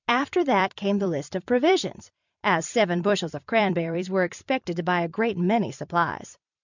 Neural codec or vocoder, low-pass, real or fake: vocoder, 44.1 kHz, 128 mel bands every 256 samples, BigVGAN v2; 7.2 kHz; fake